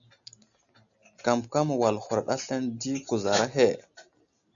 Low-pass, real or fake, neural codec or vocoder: 7.2 kHz; real; none